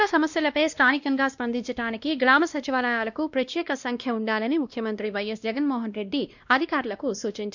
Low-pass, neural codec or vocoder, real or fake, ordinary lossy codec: 7.2 kHz; codec, 16 kHz, 1 kbps, X-Codec, WavLM features, trained on Multilingual LibriSpeech; fake; none